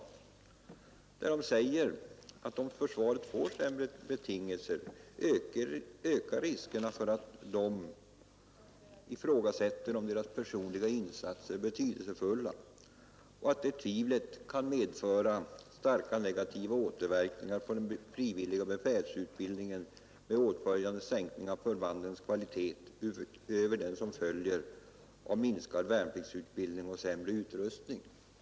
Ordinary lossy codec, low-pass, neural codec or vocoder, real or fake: none; none; none; real